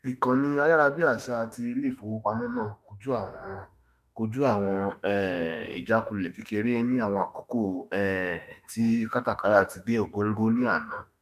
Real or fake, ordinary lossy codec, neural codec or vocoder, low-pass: fake; none; autoencoder, 48 kHz, 32 numbers a frame, DAC-VAE, trained on Japanese speech; 14.4 kHz